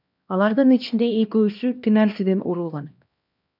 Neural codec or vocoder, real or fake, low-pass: codec, 16 kHz, 1 kbps, X-Codec, HuBERT features, trained on LibriSpeech; fake; 5.4 kHz